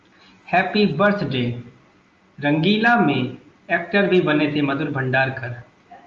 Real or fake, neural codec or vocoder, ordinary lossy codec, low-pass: real; none; Opus, 32 kbps; 7.2 kHz